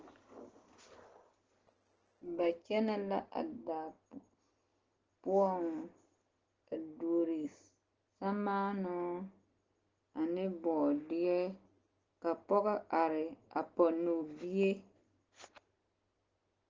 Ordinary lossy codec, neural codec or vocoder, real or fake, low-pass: Opus, 16 kbps; none; real; 7.2 kHz